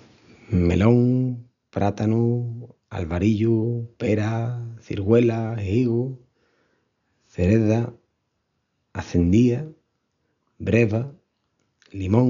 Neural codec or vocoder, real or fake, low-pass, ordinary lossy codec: none; real; 7.2 kHz; none